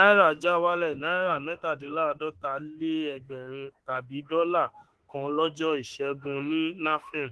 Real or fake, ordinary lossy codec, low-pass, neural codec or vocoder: fake; Opus, 16 kbps; 10.8 kHz; autoencoder, 48 kHz, 32 numbers a frame, DAC-VAE, trained on Japanese speech